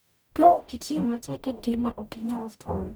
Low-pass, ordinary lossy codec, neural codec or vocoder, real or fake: none; none; codec, 44.1 kHz, 0.9 kbps, DAC; fake